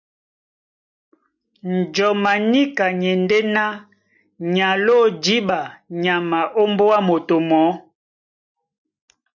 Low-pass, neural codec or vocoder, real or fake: 7.2 kHz; none; real